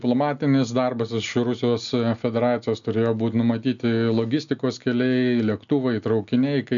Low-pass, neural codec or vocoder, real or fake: 7.2 kHz; none; real